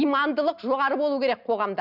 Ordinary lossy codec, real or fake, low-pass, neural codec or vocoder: none; real; 5.4 kHz; none